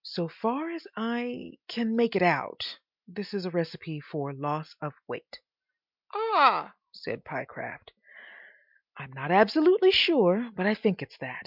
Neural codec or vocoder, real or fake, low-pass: none; real; 5.4 kHz